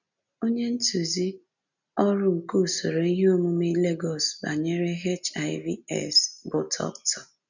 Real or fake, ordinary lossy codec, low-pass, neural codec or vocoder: real; none; 7.2 kHz; none